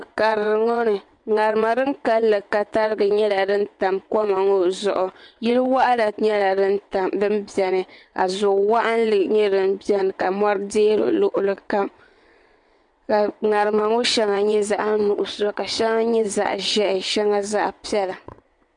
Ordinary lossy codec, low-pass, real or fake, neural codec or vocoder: MP3, 64 kbps; 9.9 kHz; fake; vocoder, 22.05 kHz, 80 mel bands, WaveNeXt